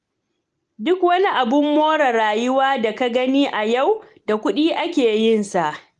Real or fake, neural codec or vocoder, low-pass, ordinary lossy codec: real; none; 10.8 kHz; Opus, 24 kbps